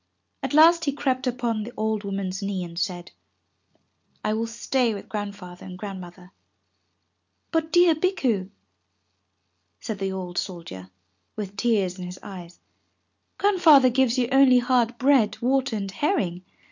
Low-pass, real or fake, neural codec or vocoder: 7.2 kHz; real; none